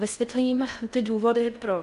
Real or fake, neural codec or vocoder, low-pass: fake; codec, 16 kHz in and 24 kHz out, 0.6 kbps, FocalCodec, streaming, 4096 codes; 10.8 kHz